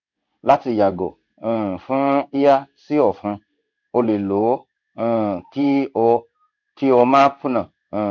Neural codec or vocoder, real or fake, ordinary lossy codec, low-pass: codec, 16 kHz in and 24 kHz out, 1 kbps, XY-Tokenizer; fake; none; 7.2 kHz